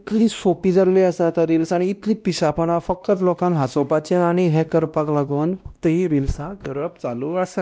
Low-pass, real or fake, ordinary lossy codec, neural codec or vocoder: none; fake; none; codec, 16 kHz, 1 kbps, X-Codec, WavLM features, trained on Multilingual LibriSpeech